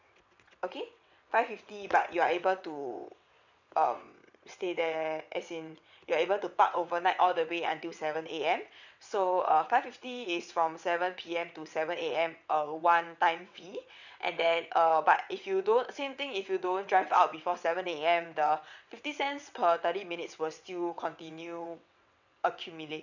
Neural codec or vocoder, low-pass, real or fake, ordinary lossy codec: vocoder, 22.05 kHz, 80 mel bands, WaveNeXt; 7.2 kHz; fake; none